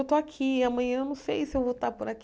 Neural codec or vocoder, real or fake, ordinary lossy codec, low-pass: none; real; none; none